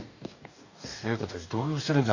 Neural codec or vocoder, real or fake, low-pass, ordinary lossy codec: codec, 44.1 kHz, 2.6 kbps, DAC; fake; 7.2 kHz; none